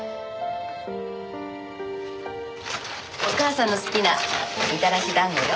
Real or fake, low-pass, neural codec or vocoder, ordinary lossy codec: real; none; none; none